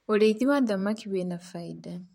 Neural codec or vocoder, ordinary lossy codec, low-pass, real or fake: vocoder, 44.1 kHz, 128 mel bands, Pupu-Vocoder; MP3, 64 kbps; 19.8 kHz; fake